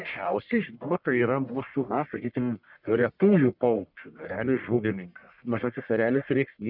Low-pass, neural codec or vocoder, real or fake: 5.4 kHz; codec, 44.1 kHz, 1.7 kbps, Pupu-Codec; fake